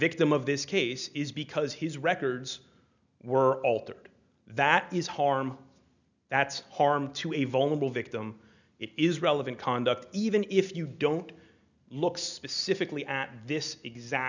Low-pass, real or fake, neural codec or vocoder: 7.2 kHz; real; none